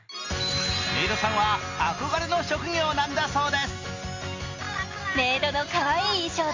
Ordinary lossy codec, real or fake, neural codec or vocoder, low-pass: AAC, 32 kbps; real; none; 7.2 kHz